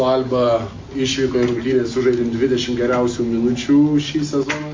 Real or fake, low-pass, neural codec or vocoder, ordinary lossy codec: real; 7.2 kHz; none; MP3, 48 kbps